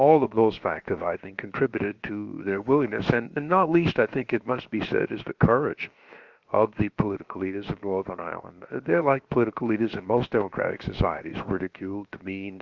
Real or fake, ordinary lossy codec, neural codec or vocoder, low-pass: fake; Opus, 32 kbps; codec, 16 kHz, about 1 kbps, DyCAST, with the encoder's durations; 7.2 kHz